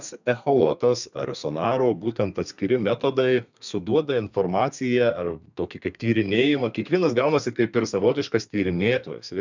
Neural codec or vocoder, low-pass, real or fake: codec, 32 kHz, 1.9 kbps, SNAC; 7.2 kHz; fake